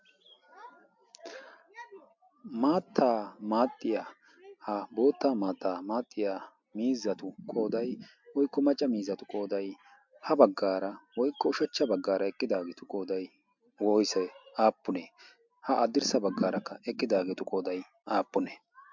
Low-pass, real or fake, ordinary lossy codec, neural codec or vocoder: 7.2 kHz; real; MP3, 64 kbps; none